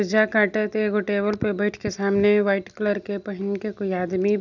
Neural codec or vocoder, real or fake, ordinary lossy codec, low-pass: none; real; none; 7.2 kHz